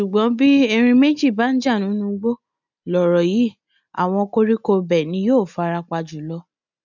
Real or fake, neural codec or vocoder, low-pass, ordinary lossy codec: real; none; 7.2 kHz; none